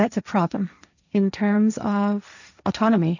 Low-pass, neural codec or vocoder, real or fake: 7.2 kHz; codec, 16 kHz, 1.1 kbps, Voila-Tokenizer; fake